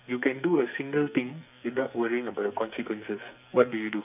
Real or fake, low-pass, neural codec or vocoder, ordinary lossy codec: fake; 3.6 kHz; codec, 44.1 kHz, 2.6 kbps, SNAC; none